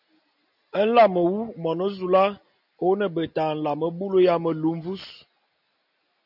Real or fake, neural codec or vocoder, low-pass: real; none; 5.4 kHz